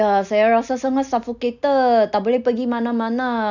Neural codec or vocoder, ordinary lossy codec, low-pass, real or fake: none; none; 7.2 kHz; real